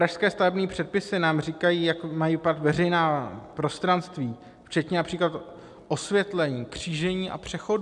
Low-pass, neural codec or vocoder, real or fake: 10.8 kHz; none; real